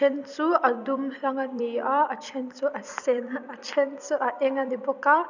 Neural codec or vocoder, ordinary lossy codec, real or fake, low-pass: vocoder, 44.1 kHz, 128 mel bands every 256 samples, BigVGAN v2; none; fake; 7.2 kHz